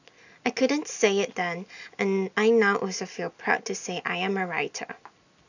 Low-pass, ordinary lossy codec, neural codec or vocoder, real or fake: 7.2 kHz; none; none; real